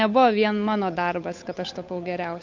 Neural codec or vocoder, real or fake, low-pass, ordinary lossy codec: codec, 16 kHz, 8 kbps, FunCodec, trained on Chinese and English, 25 frames a second; fake; 7.2 kHz; MP3, 48 kbps